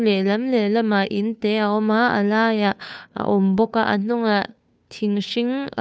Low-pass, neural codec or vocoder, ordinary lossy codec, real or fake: none; codec, 16 kHz, 2 kbps, FunCodec, trained on Chinese and English, 25 frames a second; none; fake